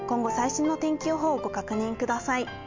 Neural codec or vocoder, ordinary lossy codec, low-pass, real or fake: none; MP3, 64 kbps; 7.2 kHz; real